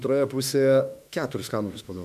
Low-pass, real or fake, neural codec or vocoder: 14.4 kHz; fake; autoencoder, 48 kHz, 32 numbers a frame, DAC-VAE, trained on Japanese speech